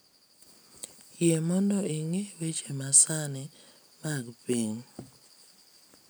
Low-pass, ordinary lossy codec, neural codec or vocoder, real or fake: none; none; none; real